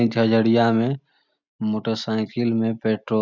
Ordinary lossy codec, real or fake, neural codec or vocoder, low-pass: none; real; none; 7.2 kHz